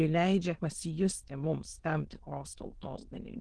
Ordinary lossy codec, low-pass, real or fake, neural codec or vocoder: Opus, 16 kbps; 9.9 kHz; fake; autoencoder, 22.05 kHz, a latent of 192 numbers a frame, VITS, trained on many speakers